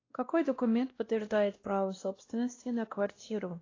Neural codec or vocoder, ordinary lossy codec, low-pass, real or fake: codec, 16 kHz, 1 kbps, X-Codec, WavLM features, trained on Multilingual LibriSpeech; AAC, 32 kbps; 7.2 kHz; fake